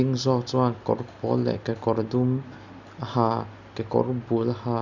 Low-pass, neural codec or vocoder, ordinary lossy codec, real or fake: 7.2 kHz; none; none; real